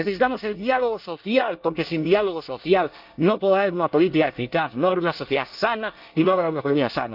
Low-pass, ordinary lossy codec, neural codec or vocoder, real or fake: 5.4 kHz; Opus, 24 kbps; codec, 24 kHz, 1 kbps, SNAC; fake